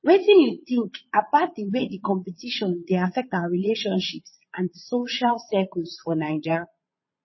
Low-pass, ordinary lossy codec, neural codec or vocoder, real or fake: 7.2 kHz; MP3, 24 kbps; vocoder, 22.05 kHz, 80 mel bands, Vocos; fake